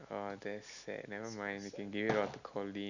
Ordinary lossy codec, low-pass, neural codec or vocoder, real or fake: none; 7.2 kHz; none; real